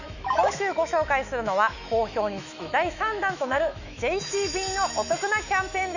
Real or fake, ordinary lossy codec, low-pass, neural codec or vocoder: fake; none; 7.2 kHz; autoencoder, 48 kHz, 128 numbers a frame, DAC-VAE, trained on Japanese speech